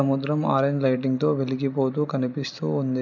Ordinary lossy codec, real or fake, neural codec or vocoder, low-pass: none; real; none; 7.2 kHz